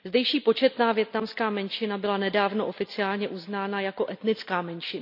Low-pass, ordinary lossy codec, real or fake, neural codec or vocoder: 5.4 kHz; none; real; none